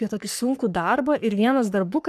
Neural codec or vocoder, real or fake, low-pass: codec, 44.1 kHz, 3.4 kbps, Pupu-Codec; fake; 14.4 kHz